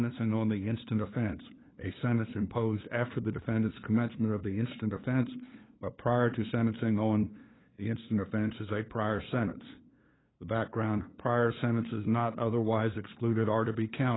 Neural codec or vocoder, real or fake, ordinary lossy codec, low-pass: codec, 16 kHz, 2 kbps, FunCodec, trained on LibriTTS, 25 frames a second; fake; AAC, 16 kbps; 7.2 kHz